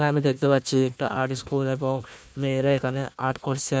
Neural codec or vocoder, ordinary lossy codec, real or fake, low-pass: codec, 16 kHz, 1 kbps, FunCodec, trained on Chinese and English, 50 frames a second; none; fake; none